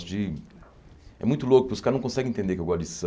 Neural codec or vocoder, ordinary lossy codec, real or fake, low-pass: none; none; real; none